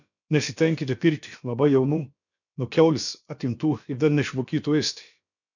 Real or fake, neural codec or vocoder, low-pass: fake; codec, 16 kHz, about 1 kbps, DyCAST, with the encoder's durations; 7.2 kHz